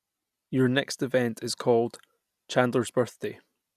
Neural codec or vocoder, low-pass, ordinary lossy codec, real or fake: none; 14.4 kHz; none; real